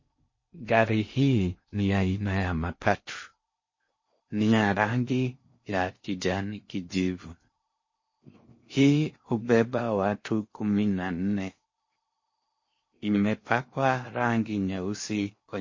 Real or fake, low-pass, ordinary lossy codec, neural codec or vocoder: fake; 7.2 kHz; MP3, 32 kbps; codec, 16 kHz in and 24 kHz out, 0.6 kbps, FocalCodec, streaming, 4096 codes